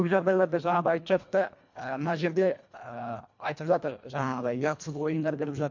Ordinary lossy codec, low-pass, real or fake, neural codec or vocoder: MP3, 48 kbps; 7.2 kHz; fake; codec, 24 kHz, 1.5 kbps, HILCodec